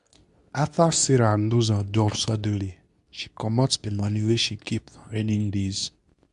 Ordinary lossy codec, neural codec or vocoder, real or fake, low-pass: none; codec, 24 kHz, 0.9 kbps, WavTokenizer, medium speech release version 2; fake; 10.8 kHz